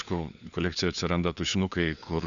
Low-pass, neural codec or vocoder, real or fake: 7.2 kHz; codec, 16 kHz, 4 kbps, FunCodec, trained on LibriTTS, 50 frames a second; fake